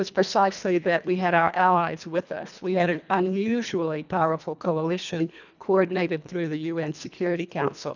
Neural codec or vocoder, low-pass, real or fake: codec, 24 kHz, 1.5 kbps, HILCodec; 7.2 kHz; fake